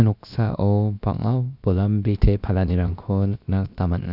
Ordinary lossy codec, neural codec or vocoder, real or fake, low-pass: none; codec, 16 kHz, about 1 kbps, DyCAST, with the encoder's durations; fake; 5.4 kHz